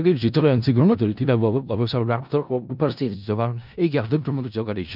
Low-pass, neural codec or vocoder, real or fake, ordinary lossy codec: 5.4 kHz; codec, 16 kHz in and 24 kHz out, 0.4 kbps, LongCat-Audio-Codec, four codebook decoder; fake; none